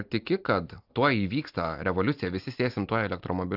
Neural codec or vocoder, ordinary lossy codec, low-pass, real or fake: none; AAC, 48 kbps; 5.4 kHz; real